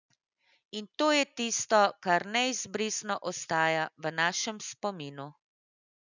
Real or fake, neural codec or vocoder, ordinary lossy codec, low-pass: real; none; none; 7.2 kHz